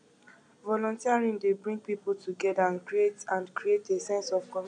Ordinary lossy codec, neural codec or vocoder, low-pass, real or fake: none; none; 9.9 kHz; real